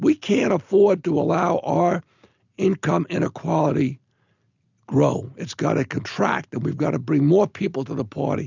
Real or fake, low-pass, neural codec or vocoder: real; 7.2 kHz; none